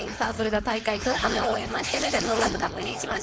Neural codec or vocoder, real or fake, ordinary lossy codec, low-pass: codec, 16 kHz, 4.8 kbps, FACodec; fake; none; none